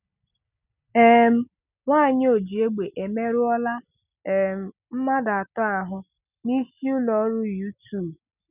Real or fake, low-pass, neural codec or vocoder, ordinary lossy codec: real; 3.6 kHz; none; none